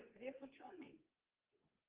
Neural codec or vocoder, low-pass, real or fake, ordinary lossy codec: codec, 24 kHz, 0.9 kbps, WavTokenizer, medium speech release version 2; 3.6 kHz; fake; Opus, 16 kbps